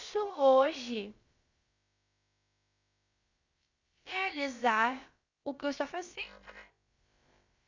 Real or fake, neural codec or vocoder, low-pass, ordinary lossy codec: fake; codec, 16 kHz, about 1 kbps, DyCAST, with the encoder's durations; 7.2 kHz; Opus, 64 kbps